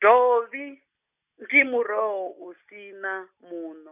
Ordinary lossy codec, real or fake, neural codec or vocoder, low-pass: none; real; none; 3.6 kHz